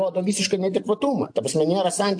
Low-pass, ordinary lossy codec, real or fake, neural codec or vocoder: 9.9 kHz; AAC, 64 kbps; real; none